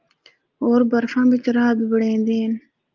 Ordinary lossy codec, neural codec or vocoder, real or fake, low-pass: Opus, 24 kbps; none; real; 7.2 kHz